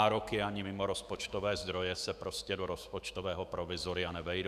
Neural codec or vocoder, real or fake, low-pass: autoencoder, 48 kHz, 128 numbers a frame, DAC-VAE, trained on Japanese speech; fake; 14.4 kHz